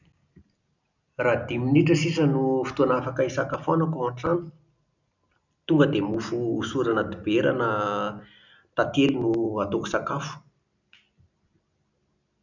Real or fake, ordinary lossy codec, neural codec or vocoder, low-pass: real; none; none; 7.2 kHz